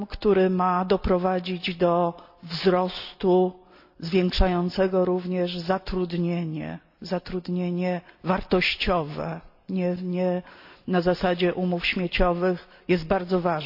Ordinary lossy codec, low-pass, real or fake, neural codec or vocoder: AAC, 48 kbps; 5.4 kHz; real; none